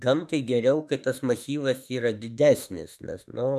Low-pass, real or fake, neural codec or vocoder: 14.4 kHz; fake; autoencoder, 48 kHz, 32 numbers a frame, DAC-VAE, trained on Japanese speech